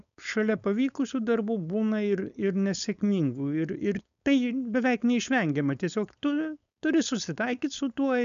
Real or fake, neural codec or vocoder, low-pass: fake; codec, 16 kHz, 4.8 kbps, FACodec; 7.2 kHz